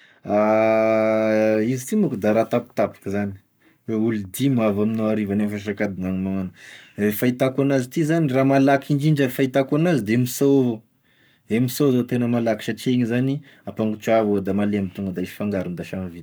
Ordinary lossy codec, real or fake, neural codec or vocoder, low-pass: none; fake; codec, 44.1 kHz, 7.8 kbps, Pupu-Codec; none